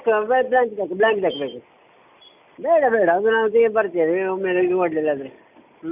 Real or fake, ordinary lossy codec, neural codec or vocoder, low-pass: real; none; none; 3.6 kHz